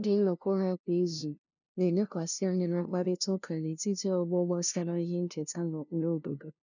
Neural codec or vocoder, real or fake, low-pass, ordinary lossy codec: codec, 16 kHz, 0.5 kbps, FunCodec, trained on LibriTTS, 25 frames a second; fake; 7.2 kHz; none